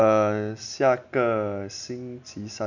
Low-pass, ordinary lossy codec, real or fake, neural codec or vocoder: 7.2 kHz; none; real; none